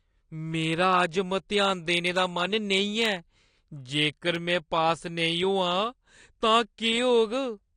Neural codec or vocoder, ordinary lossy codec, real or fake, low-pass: none; AAC, 48 kbps; real; 9.9 kHz